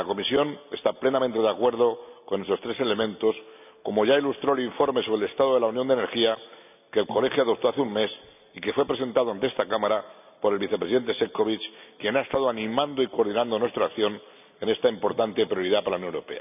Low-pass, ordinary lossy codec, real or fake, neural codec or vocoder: 3.6 kHz; none; real; none